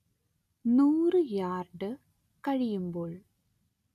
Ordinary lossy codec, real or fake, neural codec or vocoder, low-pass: none; real; none; 14.4 kHz